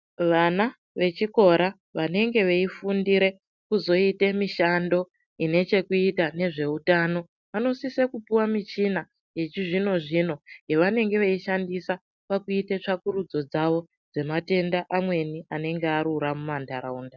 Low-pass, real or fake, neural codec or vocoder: 7.2 kHz; real; none